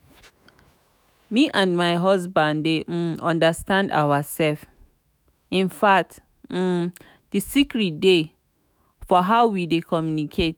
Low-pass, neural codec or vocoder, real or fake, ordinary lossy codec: none; autoencoder, 48 kHz, 128 numbers a frame, DAC-VAE, trained on Japanese speech; fake; none